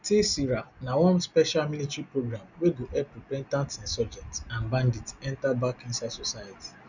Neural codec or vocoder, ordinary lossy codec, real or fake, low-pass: none; none; real; 7.2 kHz